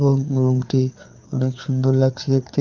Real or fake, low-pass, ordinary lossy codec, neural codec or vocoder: fake; 7.2 kHz; Opus, 32 kbps; codec, 16 kHz, 4 kbps, FreqCodec, larger model